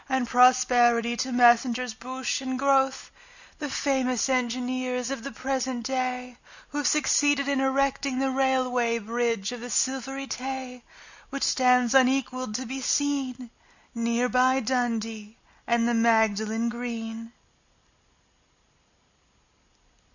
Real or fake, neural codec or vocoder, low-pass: real; none; 7.2 kHz